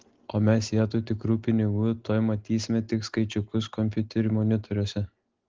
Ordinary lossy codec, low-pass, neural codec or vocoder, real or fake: Opus, 16 kbps; 7.2 kHz; none; real